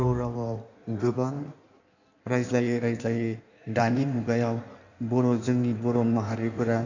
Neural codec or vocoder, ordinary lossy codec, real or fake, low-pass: codec, 16 kHz in and 24 kHz out, 1.1 kbps, FireRedTTS-2 codec; none; fake; 7.2 kHz